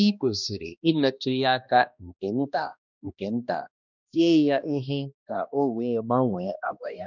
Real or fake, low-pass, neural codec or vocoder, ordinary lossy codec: fake; 7.2 kHz; codec, 16 kHz, 1 kbps, X-Codec, HuBERT features, trained on balanced general audio; none